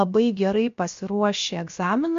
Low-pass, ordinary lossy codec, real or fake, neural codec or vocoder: 7.2 kHz; MP3, 48 kbps; fake; codec, 16 kHz, about 1 kbps, DyCAST, with the encoder's durations